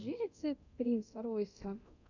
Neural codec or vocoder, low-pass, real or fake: codec, 24 kHz, 0.9 kbps, DualCodec; 7.2 kHz; fake